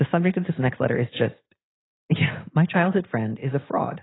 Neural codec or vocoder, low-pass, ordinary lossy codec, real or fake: none; 7.2 kHz; AAC, 16 kbps; real